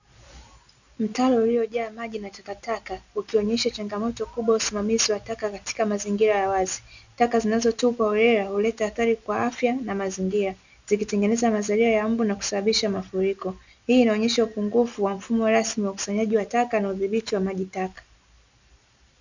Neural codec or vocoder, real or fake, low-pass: none; real; 7.2 kHz